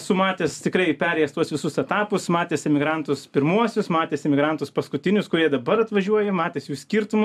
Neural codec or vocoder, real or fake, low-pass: none; real; 14.4 kHz